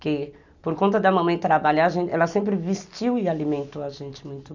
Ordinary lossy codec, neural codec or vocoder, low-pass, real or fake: none; none; 7.2 kHz; real